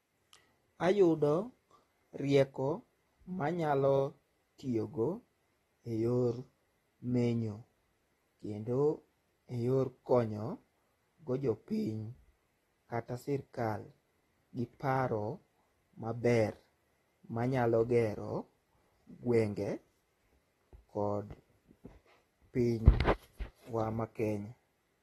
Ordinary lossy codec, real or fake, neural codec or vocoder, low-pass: AAC, 32 kbps; real; none; 14.4 kHz